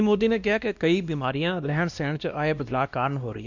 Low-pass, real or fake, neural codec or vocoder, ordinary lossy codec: 7.2 kHz; fake; codec, 16 kHz, 1 kbps, X-Codec, WavLM features, trained on Multilingual LibriSpeech; none